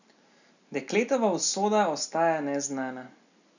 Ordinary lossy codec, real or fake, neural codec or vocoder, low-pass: none; real; none; 7.2 kHz